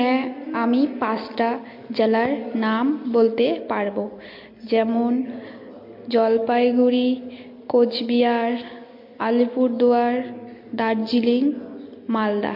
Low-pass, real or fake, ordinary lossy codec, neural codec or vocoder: 5.4 kHz; real; MP3, 32 kbps; none